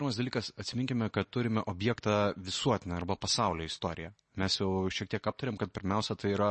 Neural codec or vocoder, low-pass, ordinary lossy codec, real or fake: none; 10.8 kHz; MP3, 32 kbps; real